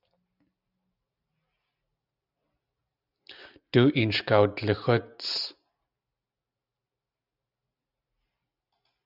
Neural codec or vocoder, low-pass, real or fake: none; 5.4 kHz; real